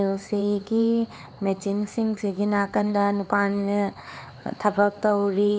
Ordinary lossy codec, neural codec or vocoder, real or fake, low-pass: none; codec, 16 kHz, 4 kbps, X-Codec, HuBERT features, trained on LibriSpeech; fake; none